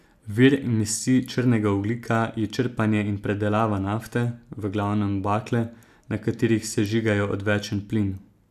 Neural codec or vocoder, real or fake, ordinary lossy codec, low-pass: none; real; none; 14.4 kHz